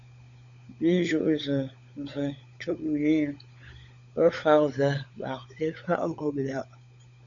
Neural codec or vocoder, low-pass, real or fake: codec, 16 kHz, 16 kbps, FunCodec, trained on LibriTTS, 50 frames a second; 7.2 kHz; fake